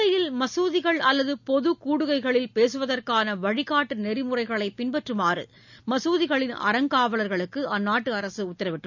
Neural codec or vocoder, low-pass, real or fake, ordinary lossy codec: none; 7.2 kHz; real; none